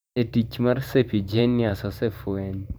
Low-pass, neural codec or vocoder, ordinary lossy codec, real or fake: none; vocoder, 44.1 kHz, 128 mel bands every 512 samples, BigVGAN v2; none; fake